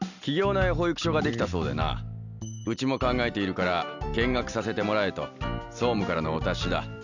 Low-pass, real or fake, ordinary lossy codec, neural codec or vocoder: 7.2 kHz; real; none; none